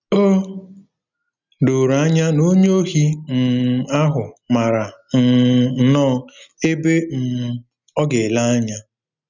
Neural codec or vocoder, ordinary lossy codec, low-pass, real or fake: none; none; 7.2 kHz; real